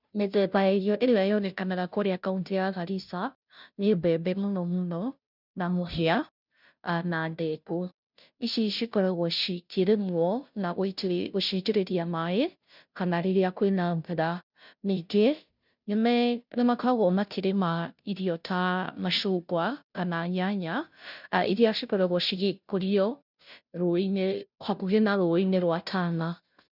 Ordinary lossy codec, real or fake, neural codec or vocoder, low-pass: none; fake; codec, 16 kHz, 0.5 kbps, FunCodec, trained on Chinese and English, 25 frames a second; 5.4 kHz